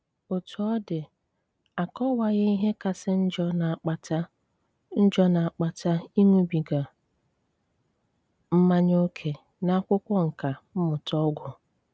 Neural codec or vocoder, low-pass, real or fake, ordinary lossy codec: none; none; real; none